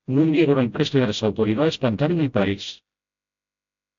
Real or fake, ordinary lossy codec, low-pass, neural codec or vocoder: fake; AAC, 64 kbps; 7.2 kHz; codec, 16 kHz, 0.5 kbps, FreqCodec, smaller model